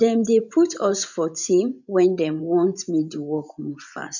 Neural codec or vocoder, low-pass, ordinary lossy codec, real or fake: vocoder, 24 kHz, 100 mel bands, Vocos; 7.2 kHz; none; fake